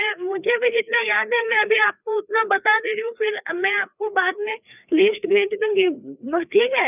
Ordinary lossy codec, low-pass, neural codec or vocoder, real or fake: none; 3.6 kHz; codec, 16 kHz, 2 kbps, FreqCodec, larger model; fake